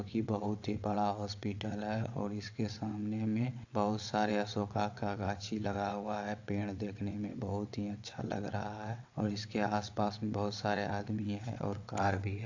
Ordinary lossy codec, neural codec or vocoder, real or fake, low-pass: AAC, 48 kbps; vocoder, 22.05 kHz, 80 mel bands, WaveNeXt; fake; 7.2 kHz